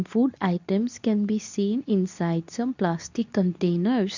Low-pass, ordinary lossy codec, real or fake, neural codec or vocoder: 7.2 kHz; none; fake; codec, 24 kHz, 0.9 kbps, WavTokenizer, medium speech release version 2